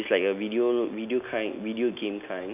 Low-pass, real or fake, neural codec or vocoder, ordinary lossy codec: 3.6 kHz; real; none; none